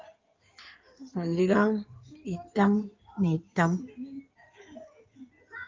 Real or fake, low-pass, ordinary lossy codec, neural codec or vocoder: fake; 7.2 kHz; Opus, 24 kbps; codec, 16 kHz in and 24 kHz out, 2.2 kbps, FireRedTTS-2 codec